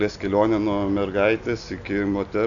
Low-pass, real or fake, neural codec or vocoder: 7.2 kHz; real; none